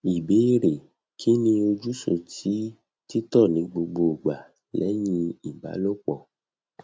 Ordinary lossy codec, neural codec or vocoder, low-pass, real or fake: none; none; none; real